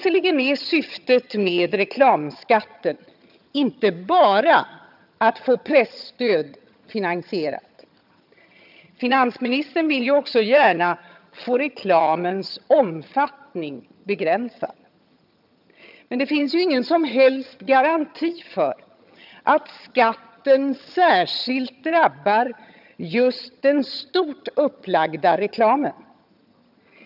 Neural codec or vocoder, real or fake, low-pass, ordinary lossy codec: vocoder, 22.05 kHz, 80 mel bands, HiFi-GAN; fake; 5.4 kHz; none